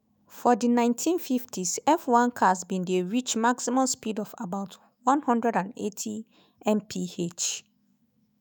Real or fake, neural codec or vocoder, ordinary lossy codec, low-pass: fake; autoencoder, 48 kHz, 128 numbers a frame, DAC-VAE, trained on Japanese speech; none; none